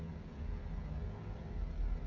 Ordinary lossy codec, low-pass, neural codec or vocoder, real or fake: none; 7.2 kHz; codec, 16 kHz, 16 kbps, FreqCodec, smaller model; fake